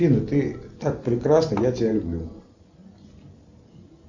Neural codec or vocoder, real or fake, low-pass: none; real; 7.2 kHz